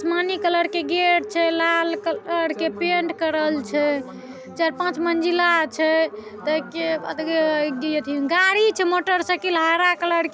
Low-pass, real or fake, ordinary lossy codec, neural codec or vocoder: none; real; none; none